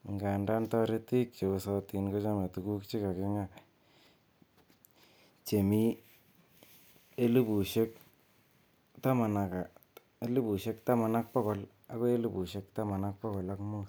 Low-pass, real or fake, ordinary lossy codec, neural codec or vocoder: none; real; none; none